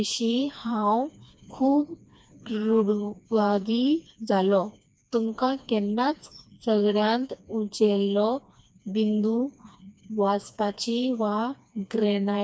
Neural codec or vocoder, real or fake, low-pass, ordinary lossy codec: codec, 16 kHz, 2 kbps, FreqCodec, smaller model; fake; none; none